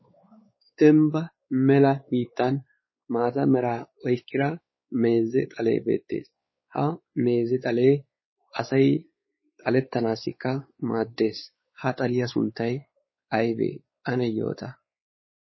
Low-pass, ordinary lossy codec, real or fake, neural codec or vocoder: 7.2 kHz; MP3, 24 kbps; fake; codec, 16 kHz, 4 kbps, X-Codec, WavLM features, trained on Multilingual LibriSpeech